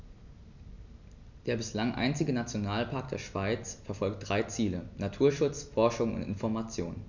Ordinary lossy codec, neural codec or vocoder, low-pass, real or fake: none; none; 7.2 kHz; real